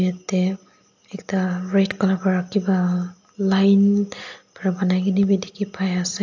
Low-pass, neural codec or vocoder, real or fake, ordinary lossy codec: 7.2 kHz; none; real; AAC, 48 kbps